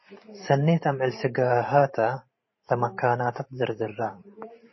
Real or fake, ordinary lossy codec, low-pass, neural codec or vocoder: fake; MP3, 24 kbps; 7.2 kHz; vocoder, 44.1 kHz, 128 mel bands every 512 samples, BigVGAN v2